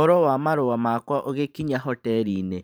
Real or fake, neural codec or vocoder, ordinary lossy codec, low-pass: fake; vocoder, 44.1 kHz, 128 mel bands every 512 samples, BigVGAN v2; none; none